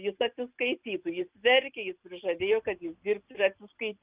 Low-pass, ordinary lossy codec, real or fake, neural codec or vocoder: 3.6 kHz; Opus, 32 kbps; real; none